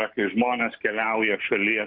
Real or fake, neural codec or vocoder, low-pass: real; none; 5.4 kHz